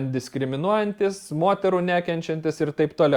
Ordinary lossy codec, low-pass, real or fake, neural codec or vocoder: Opus, 64 kbps; 19.8 kHz; real; none